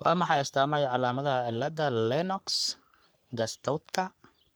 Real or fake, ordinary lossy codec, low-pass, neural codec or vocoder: fake; none; none; codec, 44.1 kHz, 3.4 kbps, Pupu-Codec